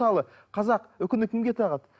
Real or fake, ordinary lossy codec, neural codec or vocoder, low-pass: real; none; none; none